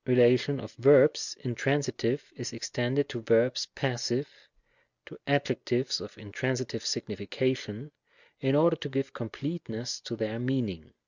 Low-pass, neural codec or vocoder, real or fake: 7.2 kHz; none; real